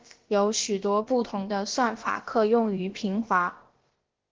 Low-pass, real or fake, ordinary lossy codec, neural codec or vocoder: 7.2 kHz; fake; Opus, 16 kbps; codec, 16 kHz, about 1 kbps, DyCAST, with the encoder's durations